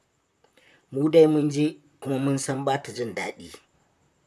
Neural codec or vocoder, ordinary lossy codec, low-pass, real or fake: vocoder, 44.1 kHz, 128 mel bands, Pupu-Vocoder; none; 14.4 kHz; fake